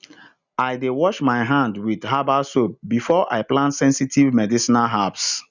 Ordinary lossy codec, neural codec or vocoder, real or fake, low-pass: none; none; real; 7.2 kHz